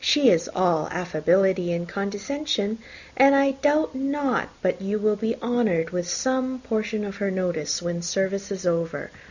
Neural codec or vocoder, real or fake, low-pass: none; real; 7.2 kHz